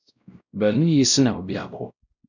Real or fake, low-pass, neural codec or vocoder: fake; 7.2 kHz; codec, 16 kHz, 0.5 kbps, X-Codec, WavLM features, trained on Multilingual LibriSpeech